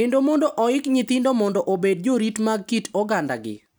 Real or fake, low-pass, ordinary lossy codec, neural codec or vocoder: real; none; none; none